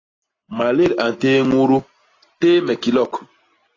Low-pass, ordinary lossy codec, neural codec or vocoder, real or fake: 7.2 kHz; AAC, 32 kbps; none; real